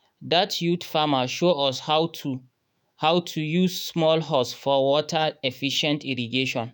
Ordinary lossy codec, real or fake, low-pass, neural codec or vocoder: none; fake; none; autoencoder, 48 kHz, 128 numbers a frame, DAC-VAE, trained on Japanese speech